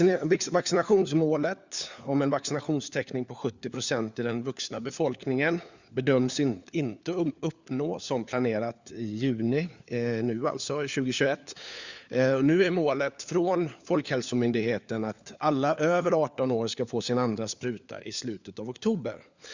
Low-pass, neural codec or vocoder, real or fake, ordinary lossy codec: 7.2 kHz; codec, 16 kHz, 4 kbps, FunCodec, trained on LibriTTS, 50 frames a second; fake; Opus, 64 kbps